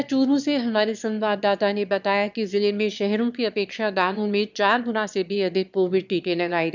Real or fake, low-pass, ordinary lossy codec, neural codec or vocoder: fake; 7.2 kHz; none; autoencoder, 22.05 kHz, a latent of 192 numbers a frame, VITS, trained on one speaker